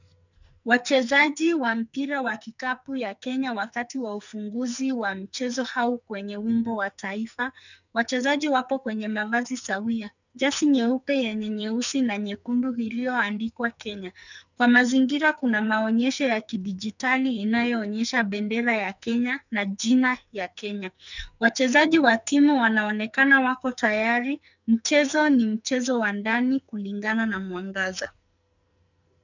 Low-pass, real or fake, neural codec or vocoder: 7.2 kHz; fake; codec, 44.1 kHz, 2.6 kbps, SNAC